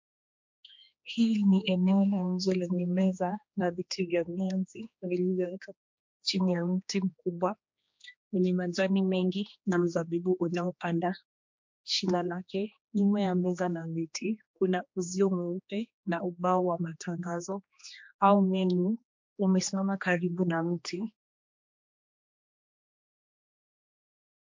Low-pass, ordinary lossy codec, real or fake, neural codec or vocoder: 7.2 kHz; MP3, 48 kbps; fake; codec, 16 kHz, 2 kbps, X-Codec, HuBERT features, trained on general audio